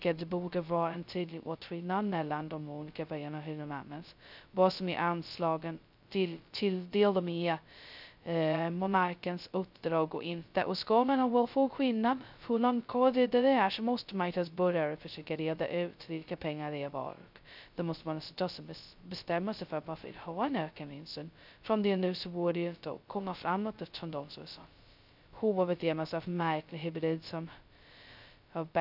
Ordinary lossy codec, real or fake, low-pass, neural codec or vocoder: none; fake; 5.4 kHz; codec, 16 kHz, 0.2 kbps, FocalCodec